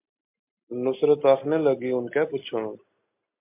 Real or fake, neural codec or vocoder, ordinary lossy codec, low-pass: real; none; MP3, 32 kbps; 3.6 kHz